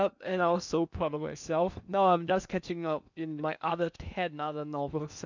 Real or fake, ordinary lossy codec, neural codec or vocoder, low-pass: fake; none; codec, 16 kHz in and 24 kHz out, 0.8 kbps, FocalCodec, streaming, 65536 codes; 7.2 kHz